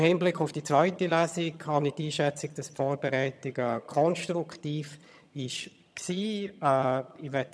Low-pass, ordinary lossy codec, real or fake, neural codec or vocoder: none; none; fake; vocoder, 22.05 kHz, 80 mel bands, HiFi-GAN